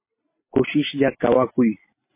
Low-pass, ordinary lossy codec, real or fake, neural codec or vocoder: 3.6 kHz; MP3, 24 kbps; fake; vocoder, 44.1 kHz, 128 mel bands every 256 samples, BigVGAN v2